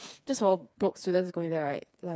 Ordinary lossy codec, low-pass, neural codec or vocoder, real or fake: none; none; codec, 16 kHz, 4 kbps, FreqCodec, smaller model; fake